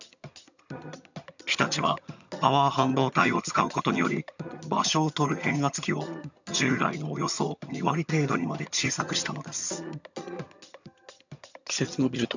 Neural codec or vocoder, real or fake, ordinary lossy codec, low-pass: vocoder, 22.05 kHz, 80 mel bands, HiFi-GAN; fake; none; 7.2 kHz